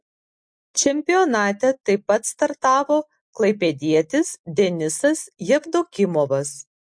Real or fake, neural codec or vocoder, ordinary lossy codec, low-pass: real; none; MP3, 48 kbps; 9.9 kHz